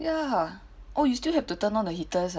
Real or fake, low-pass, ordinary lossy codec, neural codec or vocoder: real; none; none; none